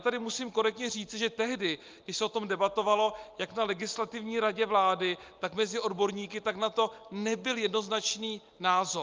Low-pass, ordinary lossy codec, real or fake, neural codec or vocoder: 7.2 kHz; Opus, 24 kbps; real; none